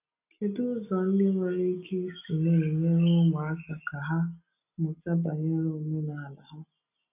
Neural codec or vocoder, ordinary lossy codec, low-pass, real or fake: none; none; 3.6 kHz; real